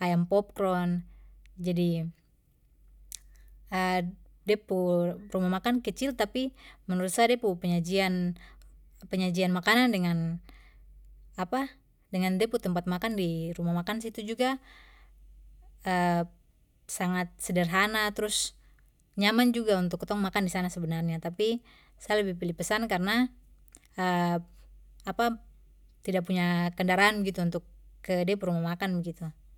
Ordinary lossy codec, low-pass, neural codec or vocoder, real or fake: none; 19.8 kHz; none; real